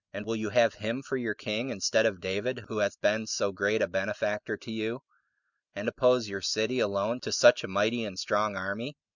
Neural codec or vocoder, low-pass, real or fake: none; 7.2 kHz; real